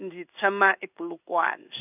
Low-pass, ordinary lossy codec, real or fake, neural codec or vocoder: 3.6 kHz; none; fake; codec, 16 kHz in and 24 kHz out, 1 kbps, XY-Tokenizer